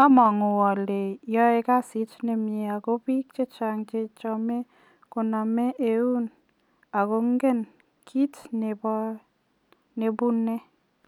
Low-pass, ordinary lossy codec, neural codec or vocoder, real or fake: 19.8 kHz; none; none; real